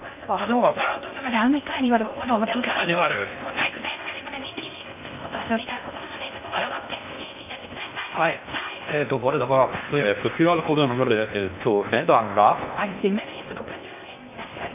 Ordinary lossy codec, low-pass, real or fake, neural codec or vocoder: none; 3.6 kHz; fake; codec, 16 kHz in and 24 kHz out, 0.6 kbps, FocalCodec, streaming, 2048 codes